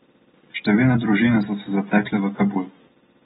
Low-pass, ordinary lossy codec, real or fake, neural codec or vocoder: 19.8 kHz; AAC, 16 kbps; real; none